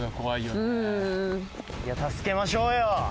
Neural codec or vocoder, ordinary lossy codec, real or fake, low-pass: none; none; real; none